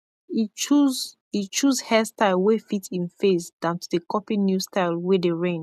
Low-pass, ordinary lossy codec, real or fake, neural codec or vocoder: 14.4 kHz; none; real; none